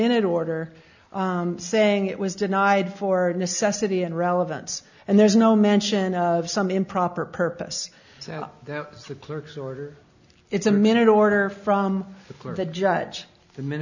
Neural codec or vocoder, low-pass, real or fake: none; 7.2 kHz; real